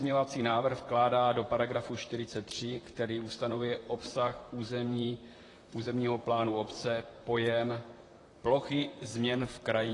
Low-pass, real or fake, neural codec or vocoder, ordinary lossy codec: 10.8 kHz; fake; vocoder, 44.1 kHz, 128 mel bands, Pupu-Vocoder; AAC, 32 kbps